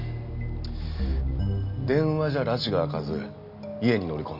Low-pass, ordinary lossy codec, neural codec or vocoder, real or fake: 5.4 kHz; none; none; real